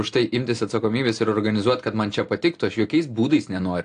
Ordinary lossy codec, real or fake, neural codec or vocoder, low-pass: AAC, 48 kbps; real; none; 9.9 kHz